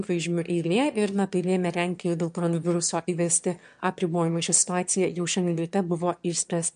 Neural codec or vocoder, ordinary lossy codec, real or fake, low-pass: autoencoder, 22.05 kHz, a latent of 192 numbers a frame, VITS, trained on one speaker; MP3, 64 kbps; fake; 9.9 kHz